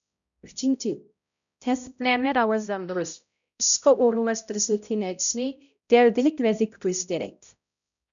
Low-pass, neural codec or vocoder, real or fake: 7.2 kHz; codec, 16 kHz, 0.5 kbps, X-Codec, HuBERT features, trained on balanced general audio; fake